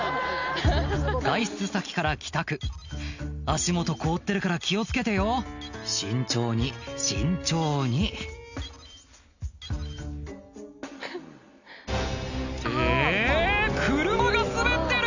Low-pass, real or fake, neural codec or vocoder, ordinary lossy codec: 7.2 kHz; real; none; none